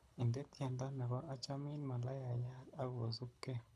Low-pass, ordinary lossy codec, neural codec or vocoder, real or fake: none; none; codec, 24 kHz, 6 kbps, HILCodec; fake